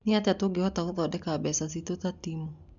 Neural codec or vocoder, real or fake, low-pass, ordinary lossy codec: none; real; 7.2 kHz; MP3, 96 kbps